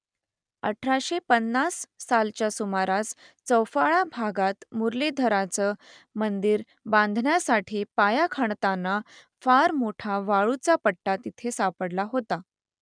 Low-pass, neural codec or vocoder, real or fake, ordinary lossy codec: 9.9 kHz; none; real; none